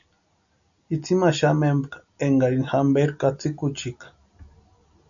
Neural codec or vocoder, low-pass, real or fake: none; 7.2 kHz; real